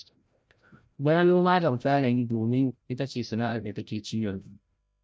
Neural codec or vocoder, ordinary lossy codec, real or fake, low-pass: codec, 16 kHz, 0.5 kbps, FreqCodec, larger model; none; fake; none